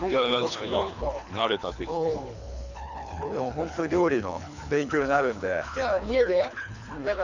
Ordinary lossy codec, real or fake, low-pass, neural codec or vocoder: none; fake; 7.2 kHz; codec, 24 kHz, 3 kbps, HILCodec